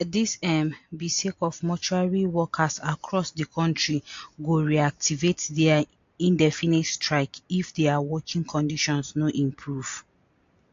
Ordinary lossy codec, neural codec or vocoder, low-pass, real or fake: AAC, 48 kbps; none; 7.2 kHz; real